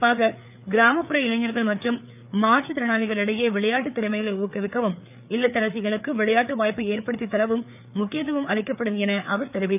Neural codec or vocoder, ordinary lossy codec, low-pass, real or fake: codec, 16 kHz, 4 kbps, FreqCodec, larger model; none; 3.6 kHz; fake